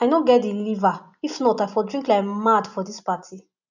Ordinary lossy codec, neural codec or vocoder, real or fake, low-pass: none; none; real; 7.2 kHz